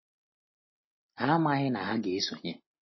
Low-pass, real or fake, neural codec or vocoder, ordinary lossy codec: 7.2 kHz; real; none; MP3, 24 kbps